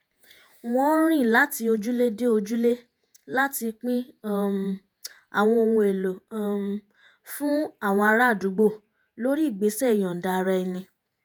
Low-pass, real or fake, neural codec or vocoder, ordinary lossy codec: none; fake; vocoder, 48 kHz, 128 mel bands, Vocos; none